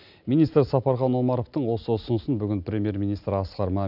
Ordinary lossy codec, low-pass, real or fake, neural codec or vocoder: none; 5.4 kHz; real; none